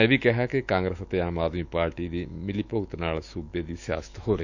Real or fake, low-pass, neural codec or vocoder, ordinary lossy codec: fake; 7.2 kHz; autoencoder, 48 kHz, 128 numbers a frame, DAC-VAE, trained on Japanese speech; none